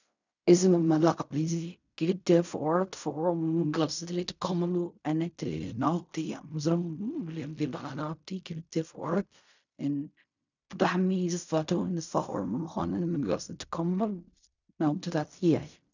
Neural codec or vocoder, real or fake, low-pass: codec, 16 kHz in and 24 kHz out, 0.4 kbps, LongCat-Audio-Codec, fine tuned four codebook decoder; fake; 7.2 kHz